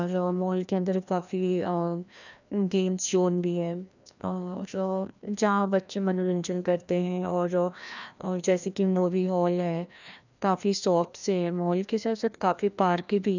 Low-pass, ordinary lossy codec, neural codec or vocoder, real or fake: 7.2 kHz; none; codec, 16 kHz, 1 kbps, FreqCodec, larger model; fake